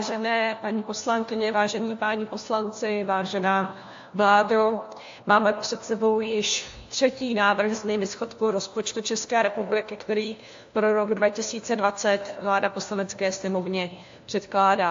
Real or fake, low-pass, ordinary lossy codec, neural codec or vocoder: fake; 7.2 kHz; AAC, 48 kbps; codec, 16 kHz, 1 kbps, FunCodec, trained on LibriTTS, 50 frames a second